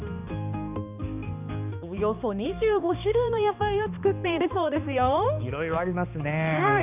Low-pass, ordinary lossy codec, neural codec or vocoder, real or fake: 3.6 kHz; none; codec, 16 kHz, 2 kbps, X-Codec, HuBERT features, trained on balanced general audio; fake